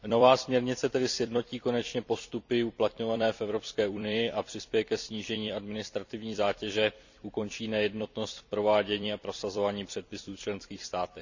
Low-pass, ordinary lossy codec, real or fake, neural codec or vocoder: 7.2 kHz; none; fake; vocoder, 44.1 kHz, 128 mel bands every 256 samples, BigVGAN v2